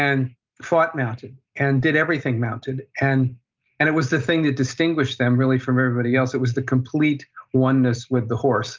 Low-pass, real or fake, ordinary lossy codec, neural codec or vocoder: 7.2 kHz; real; Opus, 24 kbps; none